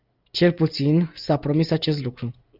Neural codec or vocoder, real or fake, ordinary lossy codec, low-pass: none; real; Opus, 24 kbps; 5.4 kHz